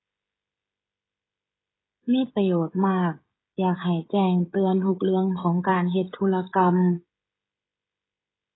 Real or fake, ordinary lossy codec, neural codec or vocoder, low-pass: fake; AAC, 16 kbps; codec, 16 kHz, 16 kbps, FreqCodec, smaller model; 7.2 kHz